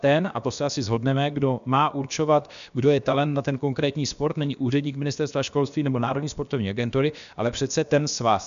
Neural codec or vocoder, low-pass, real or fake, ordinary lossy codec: codec, 16 kHz, about 1 kbps, DyCAST, with the encoder's durations; 7.2 kHz; fake; MP3, 96 kbps